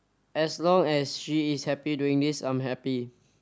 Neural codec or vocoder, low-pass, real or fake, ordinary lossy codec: none; none; real; none